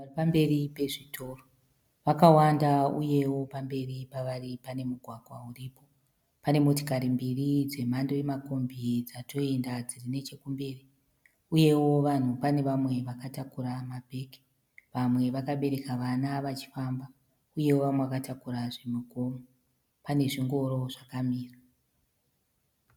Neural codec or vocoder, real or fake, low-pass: none; real; 19.8 kHz